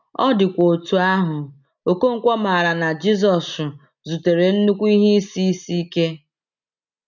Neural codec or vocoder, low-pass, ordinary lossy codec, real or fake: none; 7.2 kHz; none; real